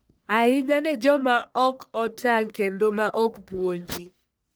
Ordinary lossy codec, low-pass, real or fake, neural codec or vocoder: none; none; fake; codec, 44.1 kHz, 1.7 kbps, Pupu-Codec